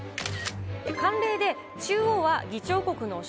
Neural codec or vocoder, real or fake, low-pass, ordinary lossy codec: none; real; none; none